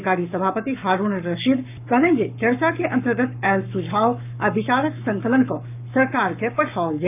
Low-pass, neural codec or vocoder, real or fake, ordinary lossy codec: 3.6 kHz; codec, 44.1 kHz, 7.8 kbps, Pupu-Codec; fake; none